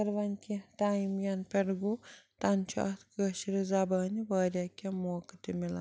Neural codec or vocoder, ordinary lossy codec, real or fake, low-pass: none; none; real; none